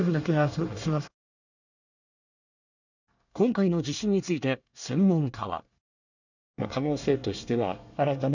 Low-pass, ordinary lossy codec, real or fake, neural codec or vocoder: 7.2 kHz; none; fake; codec, 24 kHz, 1 kbps, SNAC